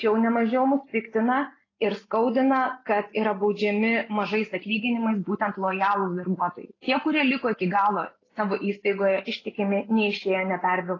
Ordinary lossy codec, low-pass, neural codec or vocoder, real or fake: AAC, 32 kbps; 7.2 kHz; none; real